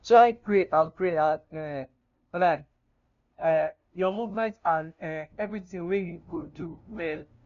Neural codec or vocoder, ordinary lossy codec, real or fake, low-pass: codec, 16 kHz, 0.5 kbps, FunCodec, trained on LibriTTS, 25 frames a second; none; fake; 7.2 kHz